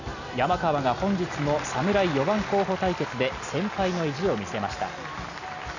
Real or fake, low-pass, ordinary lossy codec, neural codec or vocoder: real; 7.2 kHz; none; none